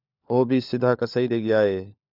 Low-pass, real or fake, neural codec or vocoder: 5.4 kHz; fake; codec, 16 kHz, 4 kbps, FunCodec, trained on LibriTTS, 50 frames a second